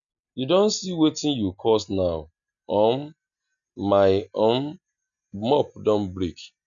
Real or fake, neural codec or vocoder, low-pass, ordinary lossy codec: real; none; 7.2 kHz; none